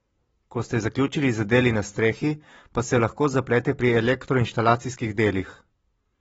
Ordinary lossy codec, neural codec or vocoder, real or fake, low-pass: AAC, 24 kbps; none; real; 19.8 kHz